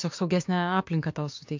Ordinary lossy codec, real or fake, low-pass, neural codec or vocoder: MP3, 48 kbps; fake; 7.2 kHz; codec, 16 kHz, 6 kbps, DAC